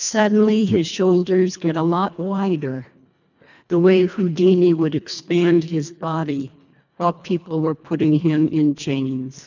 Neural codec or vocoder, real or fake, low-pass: codec, 24 kHz, 1.5 kbps, HILCodec; fake; 7.2 kHz